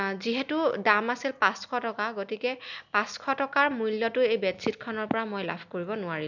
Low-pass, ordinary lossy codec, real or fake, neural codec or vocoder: 7.2 kHz; none; real; none